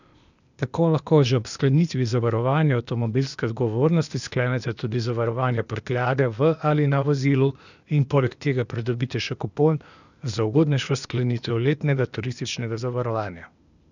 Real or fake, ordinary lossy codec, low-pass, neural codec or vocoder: fake; none; 7.2 kHz; codec, 16 kHz, 0.8 kbps, ZipCodec